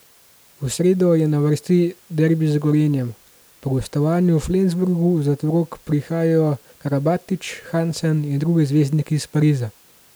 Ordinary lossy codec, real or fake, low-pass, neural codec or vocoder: none; real; none; none